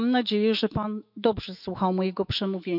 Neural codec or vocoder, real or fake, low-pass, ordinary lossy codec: autoencoder, 48 kHz, 128 numbers a frame, DAC-VAE, trained on Japanese speech; fake; 5.4 kHz; none